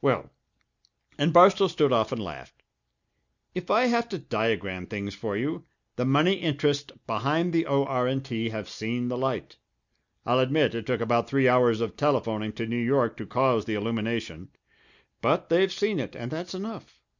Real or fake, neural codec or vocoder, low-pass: real; none; 7.2 kHz